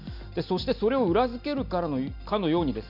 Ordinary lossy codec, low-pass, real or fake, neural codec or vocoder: none; 5.4 kHz; real; none